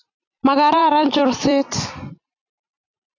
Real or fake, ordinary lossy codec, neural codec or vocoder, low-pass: fake; AAC, 48 kbps; vocoder, 44.1 kHz, 128 mel bands every 256 samples, BigVGAN v2; 7.2 kHz